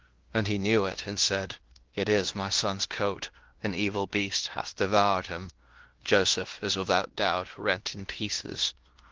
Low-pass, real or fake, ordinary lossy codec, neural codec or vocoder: 7.2 kHz; fake; Opus, 16 kbps; codec, 16 kHz in and 24 kHz out, 0.9 kbps, LongCat-Audio-Codec, fine tuned four codebook decoder